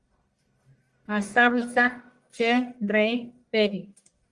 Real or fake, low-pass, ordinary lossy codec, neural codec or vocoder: fake; 10.8 kHz; Opus, 32 kbps; codec, 44.1 kHz, 1.7 kbps, Pupu-Codec